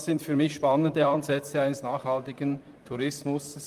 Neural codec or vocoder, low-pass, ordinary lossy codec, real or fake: vocoder, 44.1 kHz, 128 mel bands, Pupu-Vocoder; 14.4 kHz; Opus, 32 kbps; fake